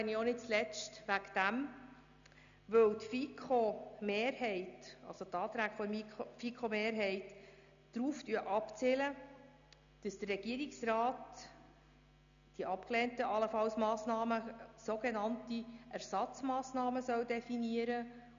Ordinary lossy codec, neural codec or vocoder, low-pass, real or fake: none; none; 7.2 kHz; real